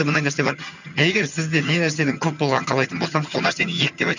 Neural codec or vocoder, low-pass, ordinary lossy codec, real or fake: vocoder, 22.05 kHz, 80 mel bands, HiFi-GAN; 7.2 kHz; none; fake